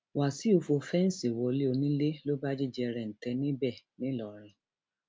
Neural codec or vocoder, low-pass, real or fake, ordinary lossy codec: none; none; real; none